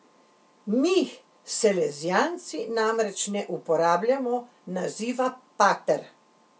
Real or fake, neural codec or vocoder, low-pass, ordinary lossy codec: real; none; none; none